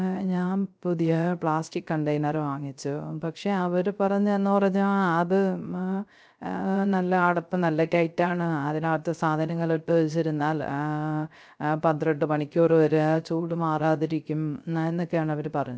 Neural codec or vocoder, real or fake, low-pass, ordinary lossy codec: codec, 16 kHz, 0.3 kbps, FocalCodec; fake; none; none